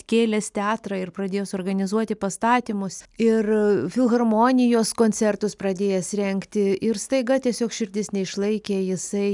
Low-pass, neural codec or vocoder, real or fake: 10.8 kHz; none; real